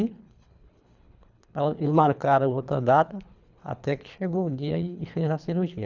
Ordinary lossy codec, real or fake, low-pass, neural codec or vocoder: none; fake; 7.2 kHz; codec, 24 kHz, 3 kbps, HILCodec